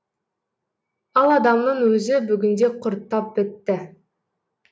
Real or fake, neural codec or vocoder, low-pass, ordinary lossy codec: real; none; none; none